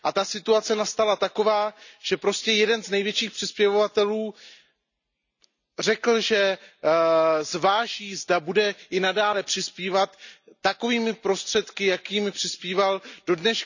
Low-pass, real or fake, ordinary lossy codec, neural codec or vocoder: 7.2 kHz; real; none; none